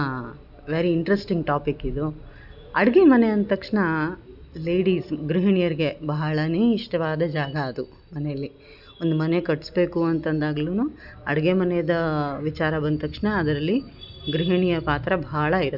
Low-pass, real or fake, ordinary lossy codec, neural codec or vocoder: 5.4 kHz; real; none; none